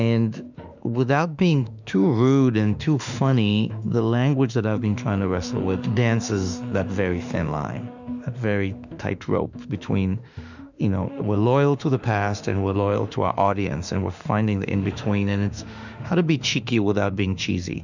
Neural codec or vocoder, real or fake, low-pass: autoencoder, 48 kHz, 32 numbers a frame, DAC-VAE, trained on Japanese speech; fake; 7.2 kHz